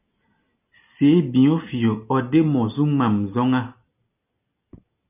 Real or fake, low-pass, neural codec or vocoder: real; 3.6 kHz; none